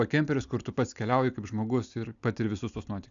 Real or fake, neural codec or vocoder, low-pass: real; none; 7.2 kHz